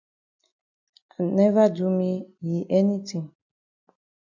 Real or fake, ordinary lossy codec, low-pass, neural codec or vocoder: real; MP3, 64 kbps; 7.2 kHz; none